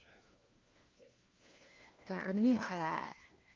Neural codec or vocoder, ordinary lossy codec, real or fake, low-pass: codec, 16 kHz, 1 kbps, FunCodec, trained on LibriTTS, 50 frames a second; Opus, 24 kbps; fake; 7.2 kHz